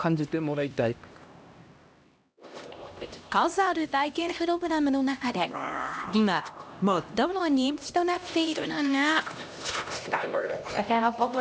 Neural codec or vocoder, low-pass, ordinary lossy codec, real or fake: codec, 16 kHz, 1 kbps, X-Codec, HuBERT features, trained on LibriSpeech; none; none; fake